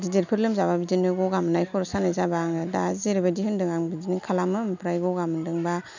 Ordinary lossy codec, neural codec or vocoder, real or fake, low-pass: none; none; real; 7.2 kHz